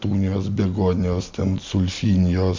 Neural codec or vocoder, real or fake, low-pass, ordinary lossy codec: none; real; 7.2 kHz; MP3, 64 kbps